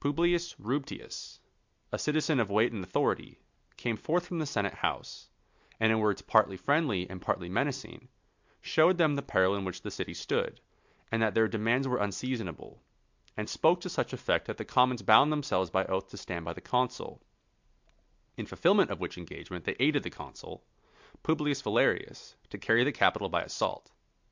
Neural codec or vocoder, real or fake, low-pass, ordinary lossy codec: none; real; 7.2 kHz; MP3, 64 kbps